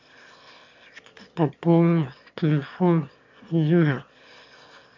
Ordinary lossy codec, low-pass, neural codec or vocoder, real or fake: MP3, 48 kbps; 7.2 kHz; autoencoder, 22.05 kHz, a latent of 192 numbers a frame, VITS, trained on one speaker; fake